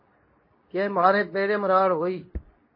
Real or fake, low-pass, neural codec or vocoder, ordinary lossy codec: fake; 5.4 kHz; codec, 24 kHz, 0.9 kbps, WavTokenizer, medium speech release version 2; MP3, 24 kbps